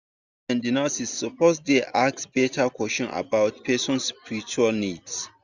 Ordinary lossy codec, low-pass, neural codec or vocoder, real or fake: none; 7.2 kHz; none; real